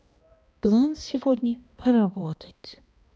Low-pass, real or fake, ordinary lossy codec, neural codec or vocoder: none; fake; none; codec, 16 kHz, 1 kbps, X-Codec, HuBERT features, trained on balanced general audio